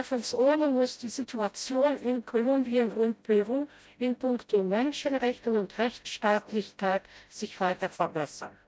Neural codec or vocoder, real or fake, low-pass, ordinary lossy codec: codec, 16 kHz, 0.5 kbps, FreqCodec, smaller model; fake; none; none